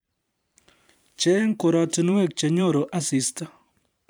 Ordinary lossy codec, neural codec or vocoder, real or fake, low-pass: none; vocoder, 44.1 kHz, 128 mel bands every 512 samples, BigVGAN v2; fake; none